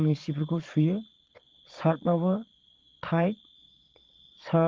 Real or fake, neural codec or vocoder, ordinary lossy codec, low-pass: fake; vocoder, 22.05 kHz, 80 mel bands, Vocos; Opus, 16 kbps; 7.2 kHz